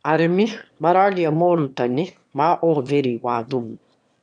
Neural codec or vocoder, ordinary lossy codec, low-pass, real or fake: autoencoder, 22.05 kHz, a latent of 192 numbers a frame, VITS, trained on one speaker; none; 9.9 kHz; fake